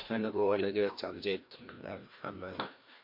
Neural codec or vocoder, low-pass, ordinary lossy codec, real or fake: codec, 16 kHz, 1 kbps, FreqCodec, larger model; 5.4 kHz; MP3, 32 kbps; fake